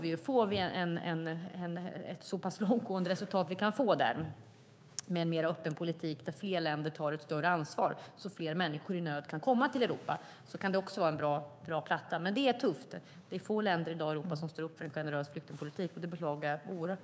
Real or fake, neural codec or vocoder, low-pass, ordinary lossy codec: fake; codec, 16 kHz, 6 kbps, DAC; none; none